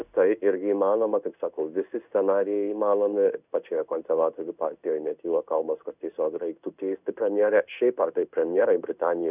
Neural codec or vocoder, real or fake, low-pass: codec, 16 kHz in and 24 kHz out, 1 kbps, XY-Tokenizer; fake; 3.6 kHz